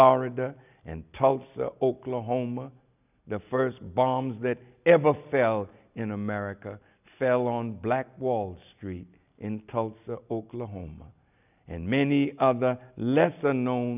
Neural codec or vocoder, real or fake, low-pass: none; real; 3.6 kHz